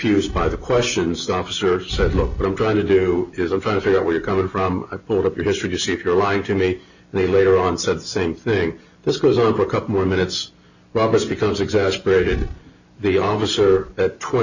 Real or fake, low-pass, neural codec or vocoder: real; 7.2 kHz; none